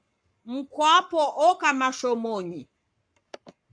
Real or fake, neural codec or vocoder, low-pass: fake; codec, 44.1 kHz, 7.8 kbps, Pupu-Codec; 9.9 kHz